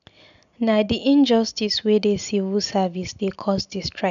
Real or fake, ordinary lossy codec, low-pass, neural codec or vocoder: real; none; 7.2 kHz; none